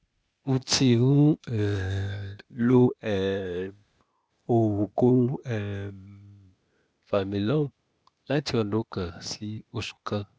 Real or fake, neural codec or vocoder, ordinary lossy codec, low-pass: fake; codec, 16 kHz, 0.8 kbps, ZipCodec; none; none